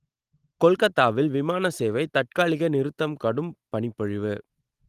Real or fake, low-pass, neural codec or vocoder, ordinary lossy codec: real; 14.4 kHz; none; Opus, 24 kbps